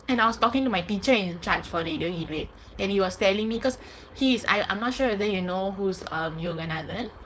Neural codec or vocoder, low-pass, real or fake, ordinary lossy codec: codec, 16 kHz, 4.8 kbps, FACodec; none; fake; none